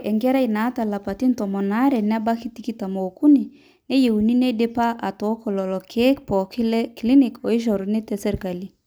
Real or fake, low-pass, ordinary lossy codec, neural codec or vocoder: real; none; none; none